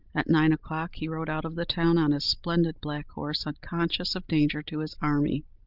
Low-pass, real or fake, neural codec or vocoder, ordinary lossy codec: 5.4 kHz; real; none; Opus, 24 kbps